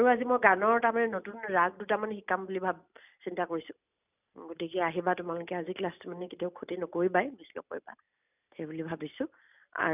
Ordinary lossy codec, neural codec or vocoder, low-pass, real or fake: none; none; 3.6 kHz; real